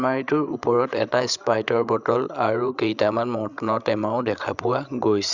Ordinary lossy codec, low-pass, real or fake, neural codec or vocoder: Opus, 64 kbps; 7.2 kHz; fake; codec, 16 kHz, 16 kbps, FreqCodec, larger model